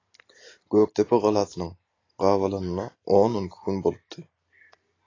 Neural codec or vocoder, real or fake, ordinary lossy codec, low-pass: none; real; AAC, 32 kbps; 7.2 kHz